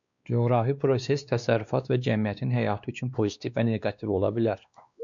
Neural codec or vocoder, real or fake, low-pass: codec, 16 kHz, 2 kbps, X-Codec, WavLM features, trained on Multilingual LibriSpeech; fake; 7.2 kHz